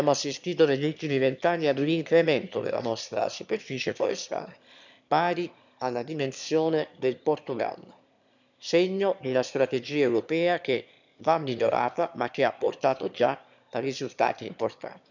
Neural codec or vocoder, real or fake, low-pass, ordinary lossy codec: autoencoder, 22.05 kHz, a latent of 192 numbers a frame, VITS, trained on one speaker; fake; 7.2 kHz; none